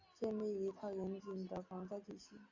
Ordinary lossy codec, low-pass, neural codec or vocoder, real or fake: AAC, 48 kbps; 7.2 kHz; none; real